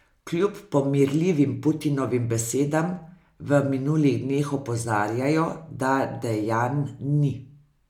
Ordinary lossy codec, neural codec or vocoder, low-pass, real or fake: MP3, 96 kbps; none; 19.8 kHz; real